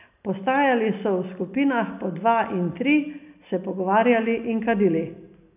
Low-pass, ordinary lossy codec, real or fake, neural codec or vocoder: 3.6 kHz; none; real; none